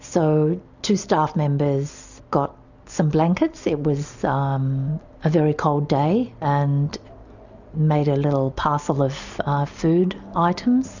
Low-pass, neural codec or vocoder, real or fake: 7.2 kHz; none; real